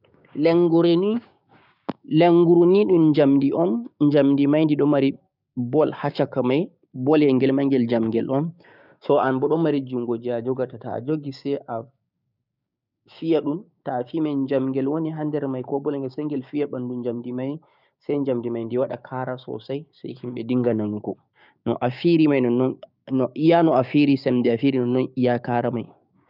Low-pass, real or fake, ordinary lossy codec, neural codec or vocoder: 5.4 kHz; fake; none; codec, 16 kHz, 6 kbps, DAC